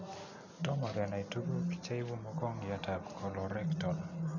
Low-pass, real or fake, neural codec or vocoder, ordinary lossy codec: 7.2 kHz; real; none; none